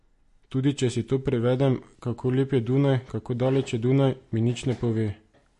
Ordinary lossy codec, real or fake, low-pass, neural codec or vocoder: MP3, 48 kbps; real; 14.4 kHz; none